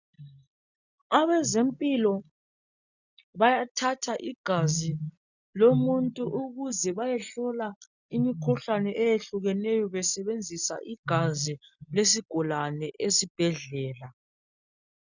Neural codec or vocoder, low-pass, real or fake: none; 7.2 kHz; real